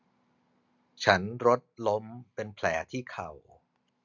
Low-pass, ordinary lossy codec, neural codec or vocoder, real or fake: 7.2 kHz; none; none; real